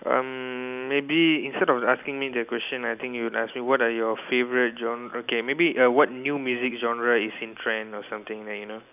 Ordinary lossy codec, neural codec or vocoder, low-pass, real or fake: none; none; 3.6 kHz; real